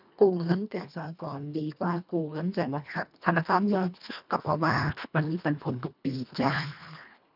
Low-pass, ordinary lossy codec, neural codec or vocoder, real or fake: 5.4 kHz; none; codec, 24 kHz, 1.5 kbps, HILCodec; fake